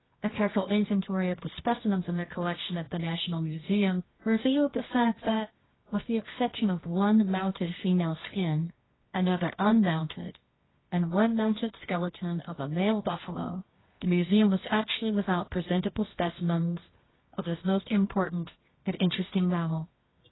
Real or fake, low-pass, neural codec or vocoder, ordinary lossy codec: fake; 7.2 kHz; codec, 24 kHz, 0.9 kbps, WavTokenizer, medium music audio release; AAC, 16 kbps